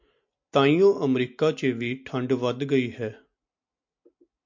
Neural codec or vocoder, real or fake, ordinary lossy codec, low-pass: none; real; MP3, 48 kbps; 7.2 kHz